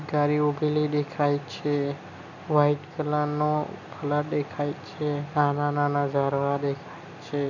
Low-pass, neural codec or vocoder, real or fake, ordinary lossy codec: 7.2 kHz; none; real; none